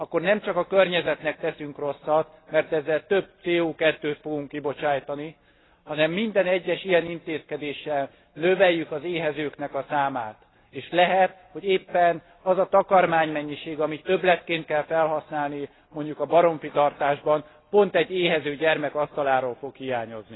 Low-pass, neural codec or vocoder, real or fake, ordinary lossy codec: 7.2 kHz; none; real; AAC, 16 kbps